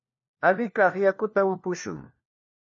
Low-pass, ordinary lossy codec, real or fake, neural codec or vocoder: 7.2 kHz; MP3, 32 kbps; fake; codec, 16 kHz, 1 kbps, FunCodec, trained on LibriTTS, 50 frames a second